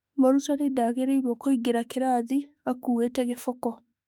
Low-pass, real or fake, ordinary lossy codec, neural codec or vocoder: 19.8 kHz; fake; none; autoencoder, 48 kHz, 32 numbers a frame, DAC-VAE, trained on Japanese speech